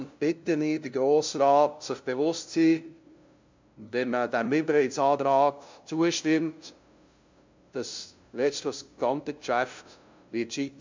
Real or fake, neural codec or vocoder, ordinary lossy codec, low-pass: fake; codec, 16 kHz, 0.5 kbps, FunCodec, trained on LibriTTS, 25 frames a second; MP3, 64 kbps; 7.2 kHz